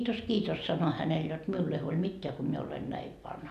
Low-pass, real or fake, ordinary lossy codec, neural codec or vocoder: 14.4 kHz; real; none; none